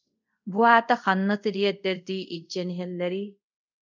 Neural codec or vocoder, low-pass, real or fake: codec, 24 kHz, 0.5 kbps, DualCodec; 7.2 kHz; fake